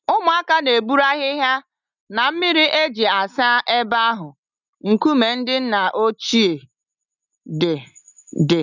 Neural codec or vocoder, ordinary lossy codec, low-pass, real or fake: none; none; 7.2 kHz; real